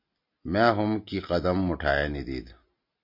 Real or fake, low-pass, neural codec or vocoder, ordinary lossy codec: real; 5.4 kHz; none; MP3, 32 kbps